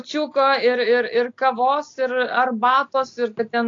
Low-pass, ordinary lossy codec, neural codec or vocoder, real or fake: 7.2 kHz; AAC, 48 kbps; none; real